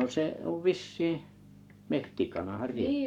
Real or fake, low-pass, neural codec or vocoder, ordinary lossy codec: fake; 19.8 kHz; codec, 44.1 kHz, 7.8 kbps, Pupu-Codec; none